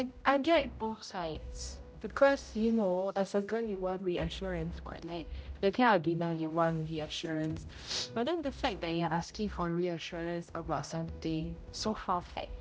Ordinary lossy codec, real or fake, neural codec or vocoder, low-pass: none; fake; codec, 16 kHz, 0.5 kbps, X-Codec, HuBERT features, trained on general audio; none